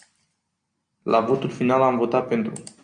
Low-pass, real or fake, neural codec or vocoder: 9.9 kHz; real; none